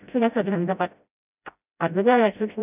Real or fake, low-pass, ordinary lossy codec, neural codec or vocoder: fake; 3.6 kHz; none; codec, 16 kHz, 0.5 kbps, FreqCodec, smaller model